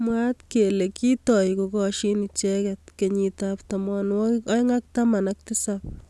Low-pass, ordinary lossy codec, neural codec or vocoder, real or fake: none; none; none; real